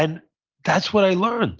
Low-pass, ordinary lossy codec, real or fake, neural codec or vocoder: 7.2 kHz; Opus, 32 kbps; real; none